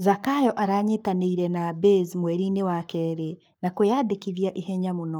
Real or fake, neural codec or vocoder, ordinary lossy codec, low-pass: fake; codec, 44.1 kHz, 7.8 kbps, Pupu-Codec; none; none